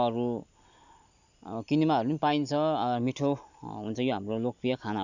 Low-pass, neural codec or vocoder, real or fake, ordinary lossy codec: 7.2 kHz; none; real; none